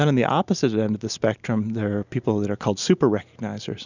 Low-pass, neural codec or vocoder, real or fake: 7.2 kHz; none; real